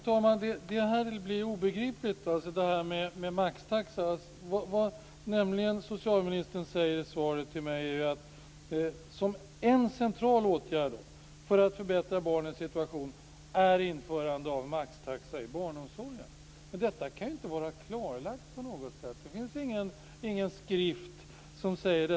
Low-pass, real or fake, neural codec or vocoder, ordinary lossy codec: none; real; none; none